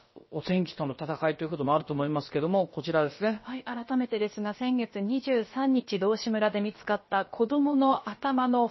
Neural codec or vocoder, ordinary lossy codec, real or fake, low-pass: codec, 16 kHz, about 1 kbps, DyCAST, with the encoder's durations; MP3, 24 kbps; fake; 7.2 kHz